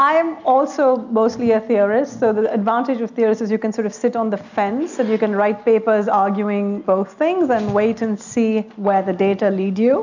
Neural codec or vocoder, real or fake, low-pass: none; real; 7.2 kHz